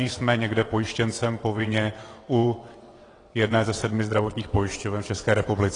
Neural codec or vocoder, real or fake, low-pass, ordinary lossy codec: vocoder, 22.05 kHz, 80 mel bands, WaveNeXt; fake; 9.9 kHz; AAC, 32 kbps